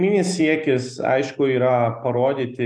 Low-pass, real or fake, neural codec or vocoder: 9.9 kHz; real; none